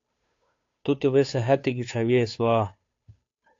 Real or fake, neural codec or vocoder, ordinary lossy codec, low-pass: fake; codec, 16 kHz, 2 kbps, FunCodec, trained on Chinese and English, 25 frames a second; AAC, 48 kbps; 7.2 kHz